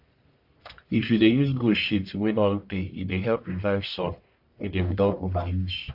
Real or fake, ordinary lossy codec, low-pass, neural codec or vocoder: fake; AAC, 48 kbps; 5.4 kHz; codec, 44.1 kHz, 1.7 kbps, Pupu-Codec